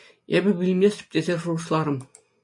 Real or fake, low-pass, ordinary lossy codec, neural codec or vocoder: real; 10.8 kHz; MP3, 64 kbps; none